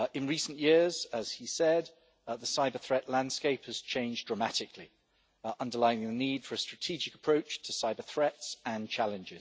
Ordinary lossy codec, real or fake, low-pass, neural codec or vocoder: none; real; none; none